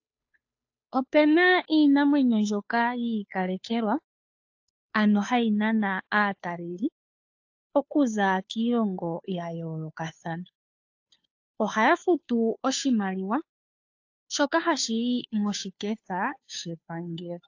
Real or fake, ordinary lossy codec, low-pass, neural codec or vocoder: fake; AAC, 48 kbps; 7.2 kHz; codec, 16 kHz, 2 kbps, FunCodec, trained on Chinese and English, 25 frames a second